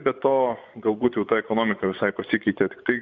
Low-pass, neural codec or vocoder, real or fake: 7.2 kHz; vocoder, 24 kHz, 100 mel bands, Vocos; fake